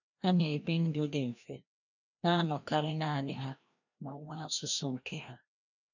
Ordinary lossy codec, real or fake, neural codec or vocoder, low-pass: none; fake; codec, 16 kHz, 1 kbps, FreqCodec, larger model; 7.2 kHz